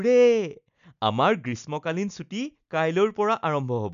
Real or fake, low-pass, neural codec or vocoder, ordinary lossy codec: real; 7.2 kHz; none; none